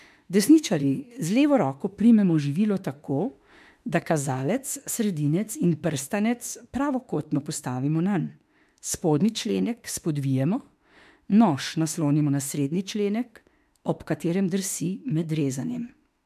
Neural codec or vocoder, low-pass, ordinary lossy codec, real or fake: autoencoder, 48 kHz, 32 numbers a frame, DAC-VAE, trained on Japanese speech; 14.4 kHz; MP3, 96 kbps; fake